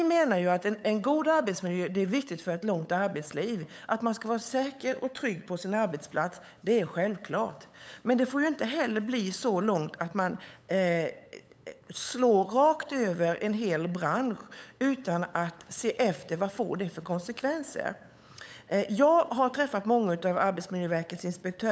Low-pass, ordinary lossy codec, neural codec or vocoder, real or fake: none; none; codec, 16 kHz, 8 kbps, FunCodec, trained on LibriTTS, 25 frames a second; fake